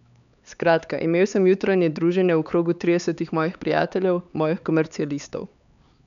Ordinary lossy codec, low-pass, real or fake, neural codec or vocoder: none; 7.2 kHz; fake; codec, 16 kHz, 4 kbps, X-Codec, HuBERT features, trained on LibriSpeech